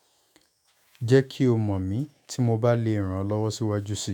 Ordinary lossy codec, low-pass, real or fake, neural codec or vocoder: none; 19.8 kHz; fake; autoencoder, 48 kHz, 128 numbers a frame, DAC-VAE, trained on Japanese speech